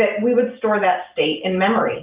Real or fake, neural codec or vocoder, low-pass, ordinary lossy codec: real; none; 3.6 kHz; Opus, 24 kbps